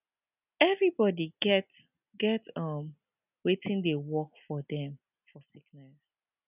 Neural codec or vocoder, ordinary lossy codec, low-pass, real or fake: none; none; 3.6 kHz; real